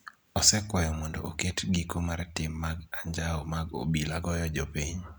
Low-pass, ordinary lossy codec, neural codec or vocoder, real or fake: none; none; none; real